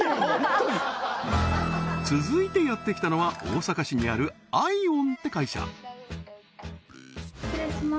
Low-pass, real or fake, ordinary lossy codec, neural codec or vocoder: none; real; none; none